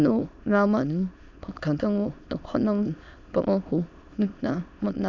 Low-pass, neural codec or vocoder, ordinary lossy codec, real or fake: 7.2 kHz; autoencoder, 22.05 kHz, a latent of 192 numbers a frame, VITS, trained on many speakers; none; fake